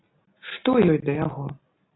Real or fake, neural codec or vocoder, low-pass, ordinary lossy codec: real; none; 7.2 kHz; AAC, 16 kbps